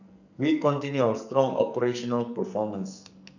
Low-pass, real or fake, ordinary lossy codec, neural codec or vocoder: 7.2 kHz; fake; none; codec, 44.1 kHz, 2.6 kbps, SNAC